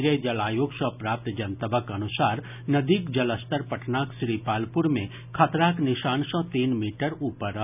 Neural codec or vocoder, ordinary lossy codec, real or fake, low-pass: none; none; real; 3.6 kHz